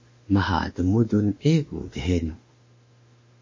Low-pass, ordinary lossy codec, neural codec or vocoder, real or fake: 7.2 kHz; MP3, 32 kbps; autoencoder, 48 kHz, 32 numbers a frame, DAC-VAE, trained on Japanese speech; fake